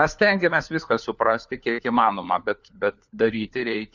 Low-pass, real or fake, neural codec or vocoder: 7.2 kHz; fake; codec, 16 kHz, 4 kbps, FunCodec, trained on LibriTTS, 50 frames a second